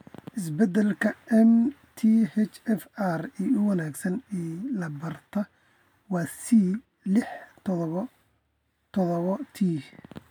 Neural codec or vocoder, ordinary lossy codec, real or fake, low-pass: none; none; real; 19.8 kHz